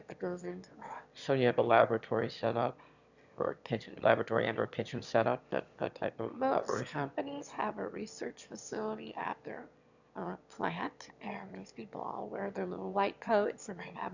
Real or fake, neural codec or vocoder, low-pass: fake; autoencoder, 22.05 kHz, a latent of 192 numbers a frame, VITS, trained on one speaker; 7.2 kHz